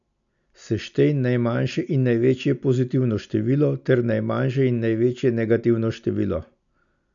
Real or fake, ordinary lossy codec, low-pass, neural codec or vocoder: real; none; 7.2 kHz; none